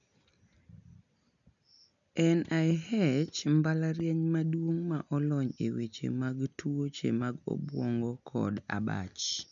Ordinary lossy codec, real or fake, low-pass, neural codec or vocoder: none; real; 7.2 kHz; none